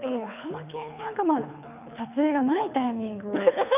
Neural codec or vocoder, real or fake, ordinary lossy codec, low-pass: codec, 24 kHz, 3 kbps, HILCodec; fake; none; 3.6 kHz